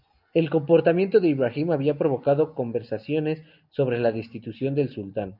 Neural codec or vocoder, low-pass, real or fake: none; 5.4 kHz; real